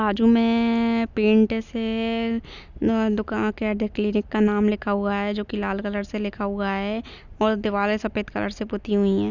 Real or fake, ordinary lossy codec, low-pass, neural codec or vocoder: real; none; 7.2 kHz; none